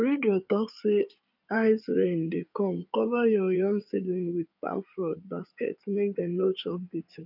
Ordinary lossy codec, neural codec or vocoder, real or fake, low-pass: none; codec, 16 kHz in and 24 kHz out, 2.2 kbps, FireRedTTS-2 codec; fake; 5.4 kHz